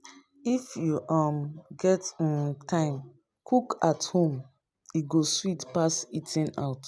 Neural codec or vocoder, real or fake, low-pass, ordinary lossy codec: none; real; none; none